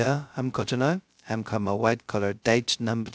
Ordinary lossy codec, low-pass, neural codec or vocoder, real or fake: none; none; codec, 16 kHz, 0.2 kbps, FocalCodec; fake